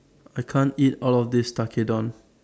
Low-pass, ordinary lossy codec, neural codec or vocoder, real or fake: none; none; none; real